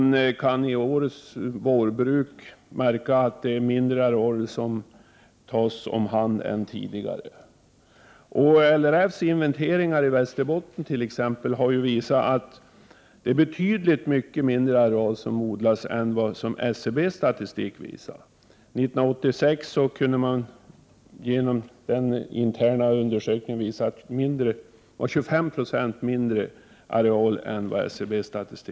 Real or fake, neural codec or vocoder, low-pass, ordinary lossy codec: real; none; none; none